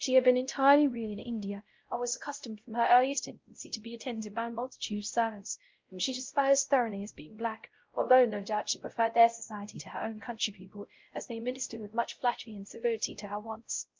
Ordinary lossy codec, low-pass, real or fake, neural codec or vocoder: Opus, 32 kbps; 7.2 kHz; fake; codec, 16 kHz, 0.5 kbps, X-Codec, WavLM features, trained on Multilingual LibriSpeech